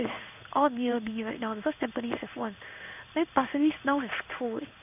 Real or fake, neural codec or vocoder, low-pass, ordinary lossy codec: fake; codec, 16 kHz in and 24 kHz out, 1 kbps, XY-Tokenizer; 3.6 kHz; none